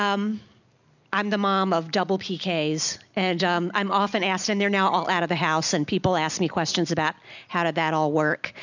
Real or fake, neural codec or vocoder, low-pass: real; none; 7.2 kHz